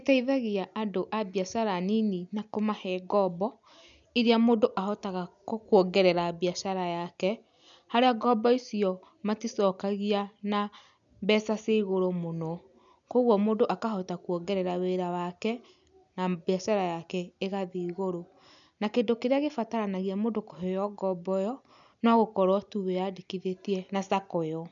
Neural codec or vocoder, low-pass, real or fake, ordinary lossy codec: none; 7.2 kHz; real; none